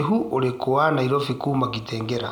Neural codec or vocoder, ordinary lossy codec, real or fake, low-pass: none; none; real; 19.8 kHz